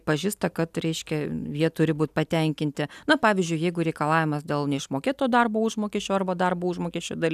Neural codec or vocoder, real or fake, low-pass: none; real; 14.4 kHz